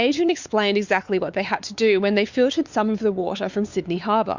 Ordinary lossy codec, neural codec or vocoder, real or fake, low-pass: Opus, 64 kbps; codec, 16 kHz, 4 kbps, X-Codec, WavLM features, trained on Multilingual LibriSpeech; fake; 7.2 kHz